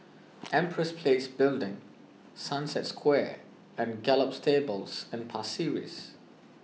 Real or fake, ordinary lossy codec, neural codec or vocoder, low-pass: real; none; none; none